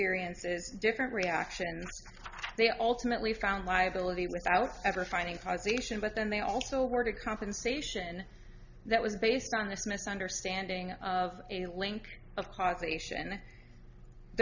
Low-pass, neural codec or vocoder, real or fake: 7.2 kHz; none; real